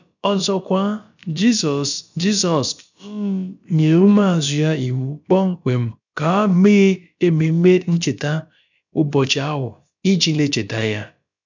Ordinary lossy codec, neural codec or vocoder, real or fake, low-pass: none; codec, 16 kHz, about 1 kbps, DyCAST, with the encoder's durations; fake; 7.2 kHz